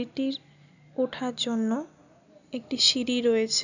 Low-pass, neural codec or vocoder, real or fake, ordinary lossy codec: 7.2 kHz; none; real; none